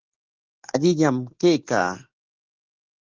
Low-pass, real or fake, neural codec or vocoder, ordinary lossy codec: 7.2 kHz; real; none; Opus, 16 kbps